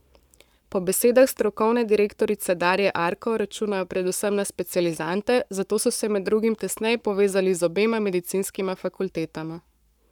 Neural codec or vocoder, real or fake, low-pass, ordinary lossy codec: codec, 44.1 kHz, 7.8 kbps, Pupu-Codec; fake; 19.8 kHz; none